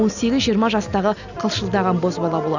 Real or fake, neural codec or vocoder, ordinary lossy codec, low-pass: real; none; none; 7.2 kHz